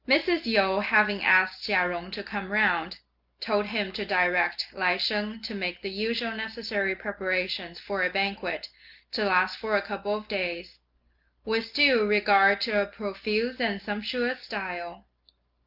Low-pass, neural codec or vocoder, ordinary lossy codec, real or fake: 5.4 kHz; none; Opus, 24 kbps; real